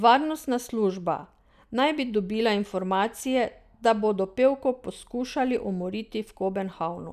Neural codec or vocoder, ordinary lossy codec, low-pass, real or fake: none; none; 14.4 kHz; real